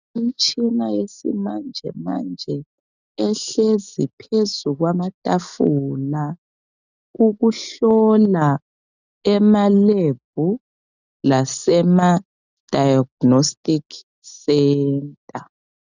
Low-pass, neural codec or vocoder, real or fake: 7.2 kHz; none; real